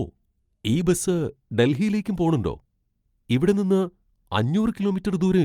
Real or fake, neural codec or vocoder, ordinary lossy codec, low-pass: real; none; Opus, 32 kbps; 19.8 kHz